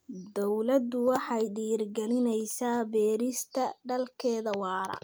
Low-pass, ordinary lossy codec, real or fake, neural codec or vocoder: none; none; real; none